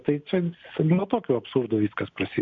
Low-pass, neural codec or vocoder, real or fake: 7.2 kHz; none; real